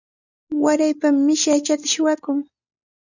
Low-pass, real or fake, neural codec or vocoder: 7.2 kHz; real; none